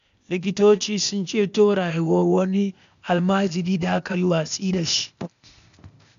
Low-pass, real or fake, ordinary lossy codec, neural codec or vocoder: 7.2 kHz; fake; MP3, 96 kbps; codec, 16 kHz, 0.8 kbps, ZipCodec